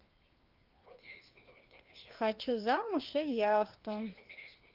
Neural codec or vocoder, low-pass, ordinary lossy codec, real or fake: codec, 16 kHz, 2 kbps, FreqCodec, larger model; 5.4 kHz; Opus, 32 kbps; fake